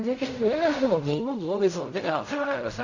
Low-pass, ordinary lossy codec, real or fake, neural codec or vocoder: 7.2 kHz; none; fake; codec, 16 kHz in and 24 kHz out, 0.4 kbps, LongCat-Audio-Codec, fine tuned four codebook decoder